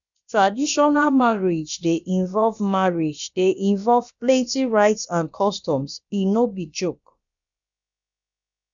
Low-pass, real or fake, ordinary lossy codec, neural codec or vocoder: 7.2 kHz; fake; none; codec, 16 kHz, about 1 kbps, DyCAST, with the encoder's durations